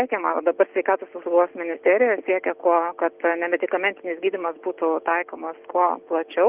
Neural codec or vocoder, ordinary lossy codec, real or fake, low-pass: none; Opus, 24 kbps; real; 3.6 kHz